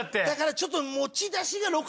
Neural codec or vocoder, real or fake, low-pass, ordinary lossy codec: none; real; none; none